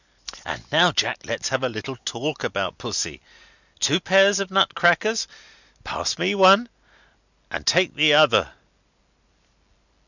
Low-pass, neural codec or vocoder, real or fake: 7.2 kHz; none; real